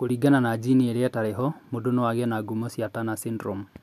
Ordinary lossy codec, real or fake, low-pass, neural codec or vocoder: MP3, 96 kbps; real; 14.4 kHz; none